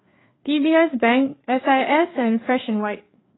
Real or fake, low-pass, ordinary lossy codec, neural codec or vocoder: fake; 7.2 kHz; AAC, 16 kbps; codec, 16 kHz, 4 kbps, FreqCodec, larger model